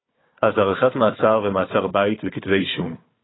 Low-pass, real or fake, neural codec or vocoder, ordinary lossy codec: 7.2 kHz; fake; codec, 16 kHz, 4 kbps, FunCodec, trained on Chinese and English, 50 frames a second; AAC, 16 kbps